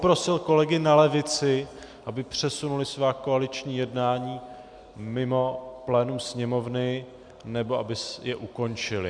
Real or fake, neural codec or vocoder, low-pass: real; none; 9.9 kHz